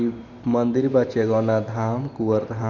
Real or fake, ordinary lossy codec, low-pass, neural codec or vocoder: fake; none; 7.2 kHz; vocoder, 44.1 kHz, 128 mel bands every 512 samples, BigVGAN v2